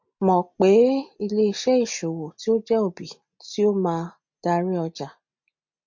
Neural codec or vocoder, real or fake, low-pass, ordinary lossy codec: none; real; 7.2 kHz; MP3, 48 kbps